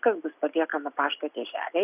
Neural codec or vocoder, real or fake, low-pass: none; real; 3.6 kHz